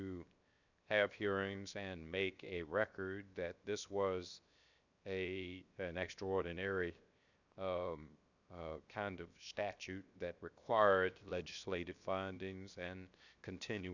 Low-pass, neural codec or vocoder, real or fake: 7.2 kHz; codec, 16 kHz, 0.7 kbps, FocalCodec; fake